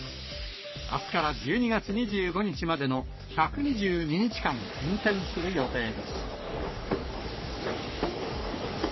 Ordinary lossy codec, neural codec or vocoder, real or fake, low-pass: MP3, 24 kbps; codec, 44.1 kHz, 3.4 kbps, Pupu-Codec; fake; 7.2 kHz